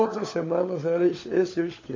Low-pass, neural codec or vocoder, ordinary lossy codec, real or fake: 7.2 kHz; codec, 16 kHz, 16 kbps, FunCodec, trained on LibriTTS, 50 frames a second; AAC, 32 kbps; fake